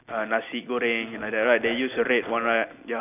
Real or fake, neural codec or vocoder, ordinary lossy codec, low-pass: real; none; none; 3.6 kHz